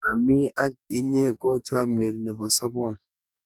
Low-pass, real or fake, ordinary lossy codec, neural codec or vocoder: 19.8 kHz; fake; none; codec, 44.1 kHz, 2.6 kbps, DAC